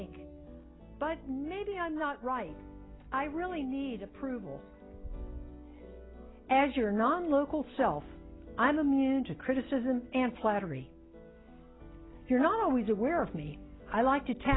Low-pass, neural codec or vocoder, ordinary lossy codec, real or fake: 7.2 kHz; none; AAC, 16 kbps; real